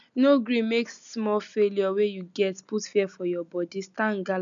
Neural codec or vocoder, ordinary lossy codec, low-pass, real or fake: none; none; 7.2 kHz; real